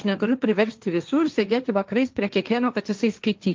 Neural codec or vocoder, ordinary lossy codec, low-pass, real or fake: codec, 16 kHz, 1.1 kbps, Voila-Tokenizer; Opus, 24 kbps; 7.2 kHz; fake